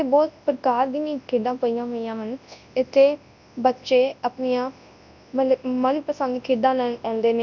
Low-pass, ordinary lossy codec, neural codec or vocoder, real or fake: 7.2 kHz; none; codec, 24 kHz, 0.9 kbps, WavTokenizer, large speech release; fake